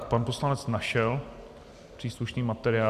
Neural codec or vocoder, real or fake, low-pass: none; real; 14.4 kHz